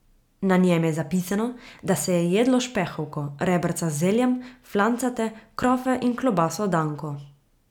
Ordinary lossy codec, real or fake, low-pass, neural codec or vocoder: none; real; 19.8 kHz; none